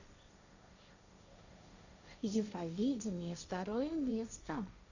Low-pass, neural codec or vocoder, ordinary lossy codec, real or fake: 7.2 kHz; codec, 16 kHz, 1.1 kbps, Voila-Tokenizer; none; fake